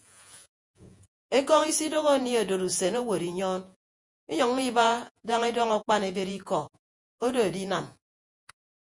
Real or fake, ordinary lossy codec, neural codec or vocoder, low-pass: fake; MP3, 64 kbps; vocoder, 48 kHz, 128 mel bands, Vocos; 10.8 kHz